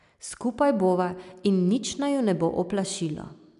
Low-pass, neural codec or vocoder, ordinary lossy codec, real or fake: 10.8 kHz; none; none; real